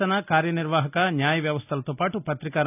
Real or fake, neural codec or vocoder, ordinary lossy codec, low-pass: real; none; none; 3.6 kHz